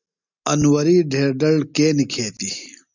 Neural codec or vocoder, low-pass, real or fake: none; 7.2 kHz; real